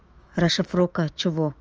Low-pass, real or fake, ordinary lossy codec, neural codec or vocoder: 7.2 kHz; fake; Opus, 24 kbps; autoencoder, 48 kHz, 128 numbers a frame, DAC-VAE, trained on Japanese speech